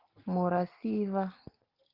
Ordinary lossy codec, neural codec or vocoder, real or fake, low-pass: Opus, 16 kbps; none; real; 5.4 kHz